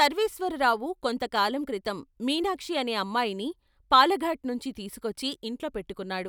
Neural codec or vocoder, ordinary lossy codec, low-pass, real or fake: none; none; none; real